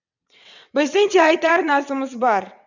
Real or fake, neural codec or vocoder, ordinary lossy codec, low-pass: fake; vocoder, 22.05 kHz, 80 mel bands, WaveNeXt; none; 7.2 kHz